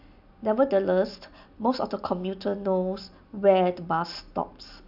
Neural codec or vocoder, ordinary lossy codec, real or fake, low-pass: none; none; real; 5.4 kHz